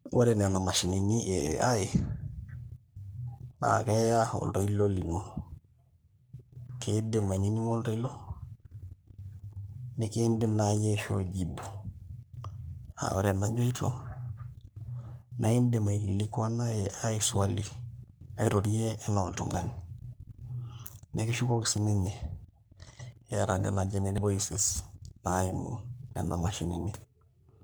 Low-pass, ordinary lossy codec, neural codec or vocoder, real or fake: none; none; codec, 44.1 kHz, 3.4 kbps, Pupu-Codec; fake